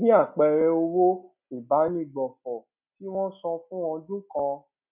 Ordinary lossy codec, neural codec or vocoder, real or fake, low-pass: none; none; real; 3.6 kHz